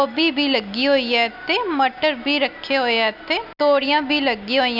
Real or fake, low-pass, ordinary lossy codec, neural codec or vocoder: real; 5.4 kHz; Opus, 64 kbps; none